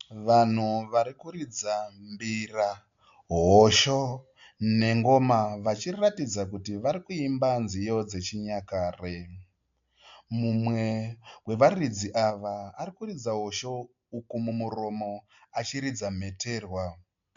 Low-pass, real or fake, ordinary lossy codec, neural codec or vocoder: 7.2 kHz; real; MP3, 64 kbps; none